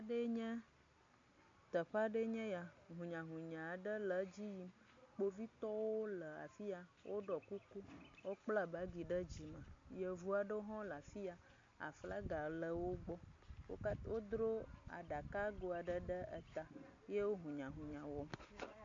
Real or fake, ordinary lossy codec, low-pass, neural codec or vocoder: real; MP3, 48 kbps; 7.2 kHz; none